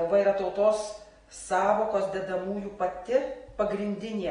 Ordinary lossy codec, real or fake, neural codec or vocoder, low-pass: AAC, 32 kbps; real; none; 9.9 kHz